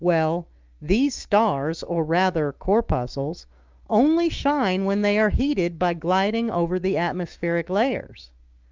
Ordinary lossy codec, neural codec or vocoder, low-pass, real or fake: Opus, 32 kbps; autoencoder, 48 kHz, 128 numbers a frame, DAC-VAE, trained on Japanese speech; 7.2 kHz; fake